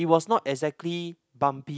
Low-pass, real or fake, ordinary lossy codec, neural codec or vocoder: none; real; none; none